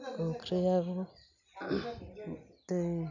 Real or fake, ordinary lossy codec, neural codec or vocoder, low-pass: real; none; none; 7.2 kHz